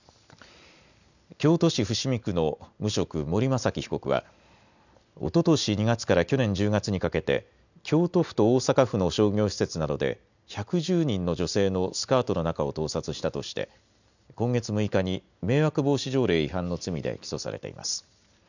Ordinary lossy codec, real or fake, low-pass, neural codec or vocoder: none; real; 7.2 kHz; none